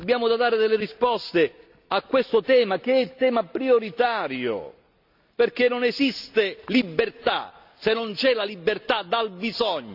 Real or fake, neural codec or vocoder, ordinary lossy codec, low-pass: real; none; none; 5.4 kHz